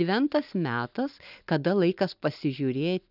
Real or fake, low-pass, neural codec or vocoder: real; 5.4 kHz; none